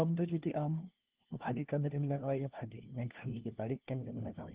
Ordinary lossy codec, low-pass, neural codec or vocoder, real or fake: Opus, 16 kbps; 3.6 kHz; codec, 16 kHz, 1 kbps, FunCodec, trained on LibriTTS, 50 frames a second; fake